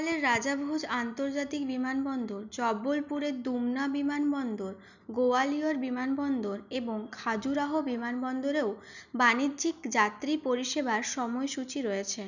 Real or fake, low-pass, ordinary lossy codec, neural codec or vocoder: real; 7.2 kHz; none; none